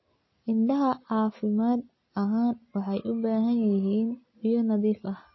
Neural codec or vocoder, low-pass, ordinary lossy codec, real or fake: none; 7.2 kHz; MP3, 24 kbps; real